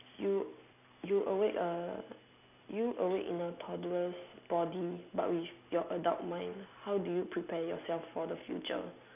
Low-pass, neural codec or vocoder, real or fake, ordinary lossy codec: 3.6 kHz; none; real; none